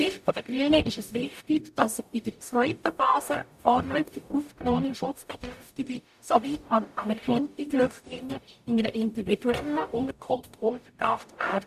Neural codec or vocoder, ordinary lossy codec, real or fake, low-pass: codec, 44.1 kHz, 0.9 kbps, DAC; none; fake; 14.4 kHz